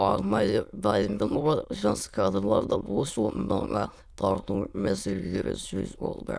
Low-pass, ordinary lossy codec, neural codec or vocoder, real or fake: none; none; autoencoder, 22.05 kHz, a latent of 192 numbers a frame, VITS, trained on many speakers; fake